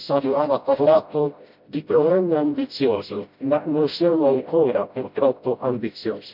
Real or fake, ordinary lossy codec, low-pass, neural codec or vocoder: fake; MP3, 48 kbps; 5.4 kHz; codec, 16 kHz, 0.5 kbps, FreqCodec, smaller model